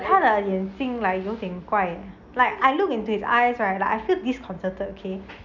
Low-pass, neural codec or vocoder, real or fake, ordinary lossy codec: 7.2 kHz; none; real; none